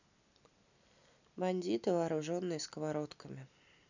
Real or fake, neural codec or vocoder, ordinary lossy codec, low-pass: real; none; none; 7.2 kHz